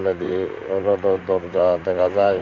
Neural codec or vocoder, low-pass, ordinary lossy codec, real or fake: vocoder, 44.1 kHz, 128 mel bands, Pupu-Vocoder; 7.2 kHz; none; fake